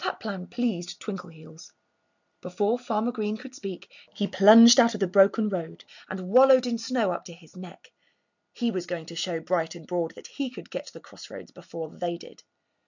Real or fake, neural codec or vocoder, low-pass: real; none; 7.2 kHz